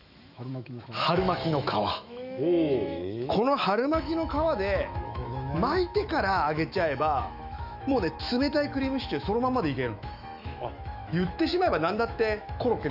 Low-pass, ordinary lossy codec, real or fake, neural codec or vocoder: 5.4 kHz; none; fake; autoencoder, 48 kHz, 128 numbers a frame, DAC-VAE, trained on Japanese speech